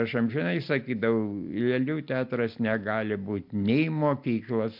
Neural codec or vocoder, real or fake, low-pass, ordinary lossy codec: none; real; 5.4 kHz; MP3, 48 kbps